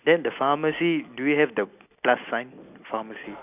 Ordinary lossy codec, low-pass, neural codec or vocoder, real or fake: none; 3.6 kHz; none; real